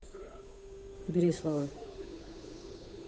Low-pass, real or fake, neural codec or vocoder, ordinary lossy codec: none; fake; codec, 16 kHz, 8 kbps, FunCodec, trained on Chinese and English, 25 frames a second; none